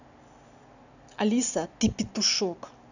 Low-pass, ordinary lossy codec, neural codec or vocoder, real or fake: 7.2 kHz; none; none; real